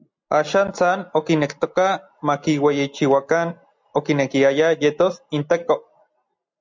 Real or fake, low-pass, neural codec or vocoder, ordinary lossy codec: real; 7.2 kHz; none; MP3, 64 kbps